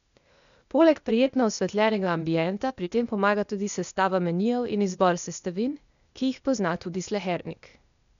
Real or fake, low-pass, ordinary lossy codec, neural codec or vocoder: fake; 7.2 kHz; none; codec, 16 kHz, 0.8 kbps, ZipCodec